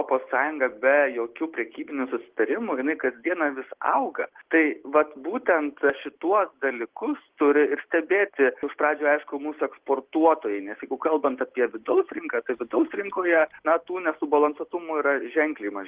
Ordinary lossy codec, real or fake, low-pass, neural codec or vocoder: Opus, 16 kbps; real; 3.6 kHz; none